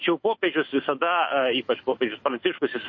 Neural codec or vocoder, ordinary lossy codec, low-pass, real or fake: autoencoder, 48 kHz, 128 numbers a frame, DAC-VAE, trained on Japanese speech; MP3, 32 kbps; 7.2 kHz; fake